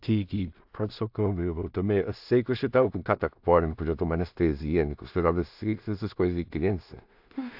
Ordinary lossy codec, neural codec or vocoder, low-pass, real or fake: AAC, 48 kbps; codec, 16 kHz in and 24 kHz out, 0.4 kbps, LongCat-Audio-Codec, two codebook decoder; 5.4 kHz; fake